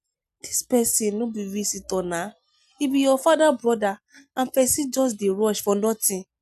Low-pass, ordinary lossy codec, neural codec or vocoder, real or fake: 14.4 kHz; none; none; real